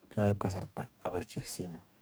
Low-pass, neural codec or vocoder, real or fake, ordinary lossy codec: none; codec, 44.1 kHz, 2.6 kbps, DAC; fake; none